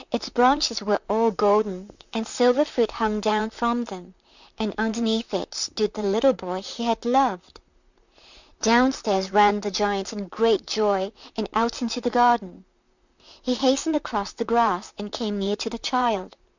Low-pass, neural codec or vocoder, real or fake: 7.2 kHz; vocoder, 44.1 kHz, 128 mel bands, Pupu-Vocoder; fake